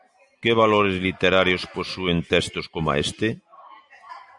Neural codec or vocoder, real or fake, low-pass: none; real; 10.8 kHz